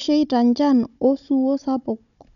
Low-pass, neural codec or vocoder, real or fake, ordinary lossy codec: 7.2 kHz; codec, 16 kHz, 16 kbps, FunCodec, trained on Chinese and English, 50 frames a second; fake; none